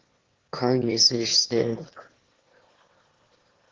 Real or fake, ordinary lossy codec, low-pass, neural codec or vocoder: fake; Opus, 16 kbps; 7.2 kHz; autoencoder, 22.05 kHz, a latent of 192 numbers a frame, VITS, trained on one speaker